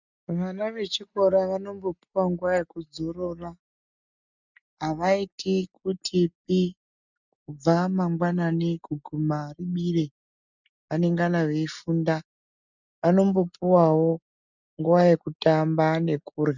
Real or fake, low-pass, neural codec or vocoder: real; 7.2 kHz; none